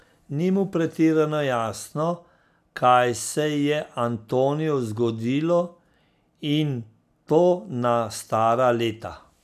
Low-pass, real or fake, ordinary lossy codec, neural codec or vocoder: 14.4 kHz; real; none; none